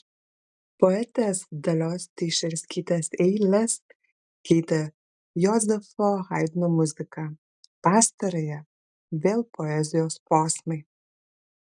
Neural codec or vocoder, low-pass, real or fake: none; 10.8 kHz; real